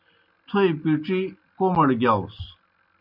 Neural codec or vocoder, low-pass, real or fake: none; 5.4 kHz; real